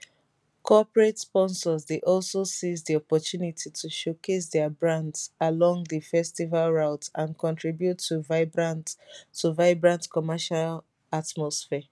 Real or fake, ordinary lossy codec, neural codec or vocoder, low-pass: real; none; none; none